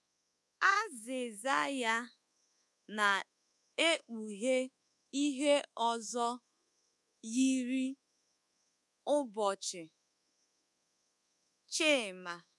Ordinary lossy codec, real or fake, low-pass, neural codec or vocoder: none; fake; none; codec, 24 kHz, 1.2 kbps, DualCodec